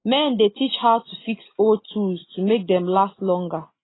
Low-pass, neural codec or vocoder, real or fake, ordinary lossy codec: 7.2 kHz; none; real; AAC, 16 kbps